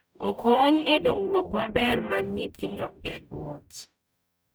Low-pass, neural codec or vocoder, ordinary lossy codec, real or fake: none; codec, 44.1 kHz, 0.9 kbps, DAC; none; fake